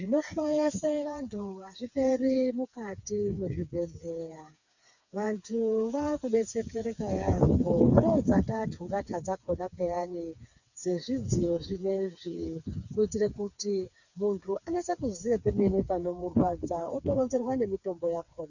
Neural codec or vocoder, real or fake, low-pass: codec, 16 kHz, 4 kbps, FreqCodec, smaller model; fake; 7.2 kHz